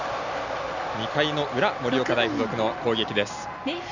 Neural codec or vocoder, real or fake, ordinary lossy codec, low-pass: none; real; none; 7.2 kHz